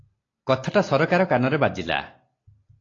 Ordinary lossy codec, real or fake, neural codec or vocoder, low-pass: AAC, 32 kbps; real; none; 7.2 kHz